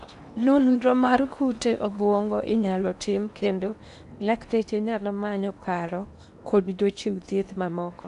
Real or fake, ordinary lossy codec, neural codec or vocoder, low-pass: fake; none; codec, 16 kHz in and 24 kHz out, 0.8 kbps, FocalCodec, streaming, 65536 codes; 10.8 kHz